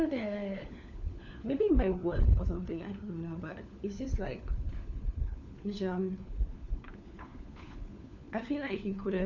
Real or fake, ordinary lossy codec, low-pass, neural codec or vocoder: fake; none; 7.2 kHz; codec, 16 kHz, 4 kbps, FunCodec, trained on LibriTTS, 50 frames a second